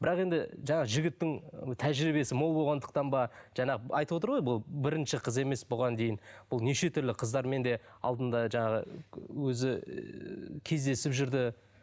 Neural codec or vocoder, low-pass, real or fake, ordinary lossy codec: none; none; real; none